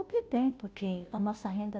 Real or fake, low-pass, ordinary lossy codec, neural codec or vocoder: fake; none; none; codec, 16 kHz, 0.5 kbps, FunCodec, trained on Chinese and English, 25 frames a second